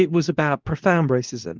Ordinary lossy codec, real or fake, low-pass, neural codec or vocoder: Opus, 32 kbps; fake; 7.2 kHz; codec, 24 kHz, 0.9 kbps, WavTokenizer, medium speech release version 2